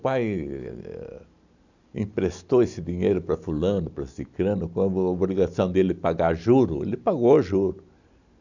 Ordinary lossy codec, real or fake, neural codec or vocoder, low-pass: none; real; none; 7.2 kHz